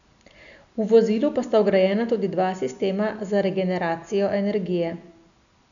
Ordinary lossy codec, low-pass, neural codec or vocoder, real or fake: none; 7.2 kHz; none; real